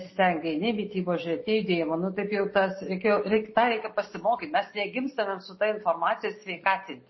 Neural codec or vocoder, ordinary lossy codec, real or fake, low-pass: none; MP3, 24 kbps; real; 7.2 kHz